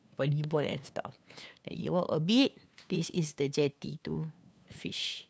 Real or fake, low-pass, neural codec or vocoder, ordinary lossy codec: fake; none; codec, 16 kHz, 2 kbps, FunCodec, trained on LibriTTS, 25 frames a second; none